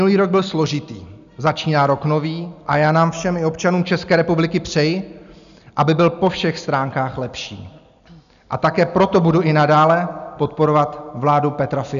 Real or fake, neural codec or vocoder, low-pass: real; none; 7.2 kHz